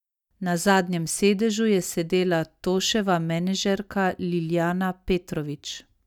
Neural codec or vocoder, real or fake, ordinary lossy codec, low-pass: none; real; none; 19.8 kHz